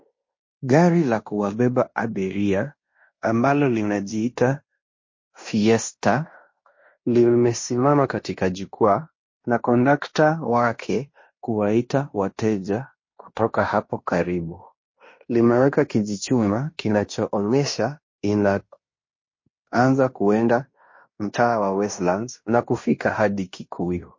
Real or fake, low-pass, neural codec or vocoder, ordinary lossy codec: fake; 7.2 kHz; codec, 16 kHz in and 24 kHz out, 0.9 kbps, LongCat-Audio-Codec, fine tuned four codebook decoder; MP3, 32 kbps